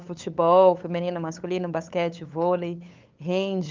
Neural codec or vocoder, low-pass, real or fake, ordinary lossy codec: codec, 16 kHz, 8 kbps, FunCodec, trained on LibriTTS, 25 frames a second; 7.2 kHz; fake; Opus, 32 kbps